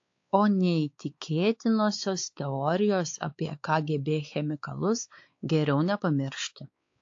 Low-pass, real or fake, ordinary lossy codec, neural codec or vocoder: 7.2 kHz; fake; AAC, 48 kbps; codec, 16 kHz, 4 kbps, X-Codec, WavLM features, trained on Multilingual LibriSpeech